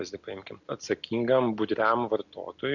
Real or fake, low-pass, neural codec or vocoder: real; 7.2 kHz; none